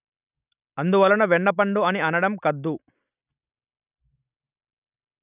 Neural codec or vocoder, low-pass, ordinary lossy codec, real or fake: none; 3.6 kHz; none; real